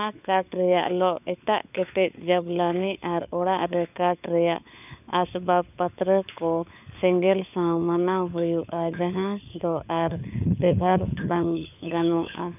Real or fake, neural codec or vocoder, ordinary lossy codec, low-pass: fake; codec, 16 kHz, 4 kbps, FunCodec, trained on LibriTTS, 50 frames a second; none; 3.6 kHz